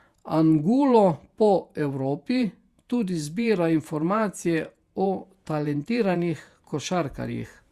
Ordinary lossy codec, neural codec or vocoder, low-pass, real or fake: Opus, 64 kbps; vocoder, 48 kHz, 128 mel bands, Vocos; 14.4 kHz; fake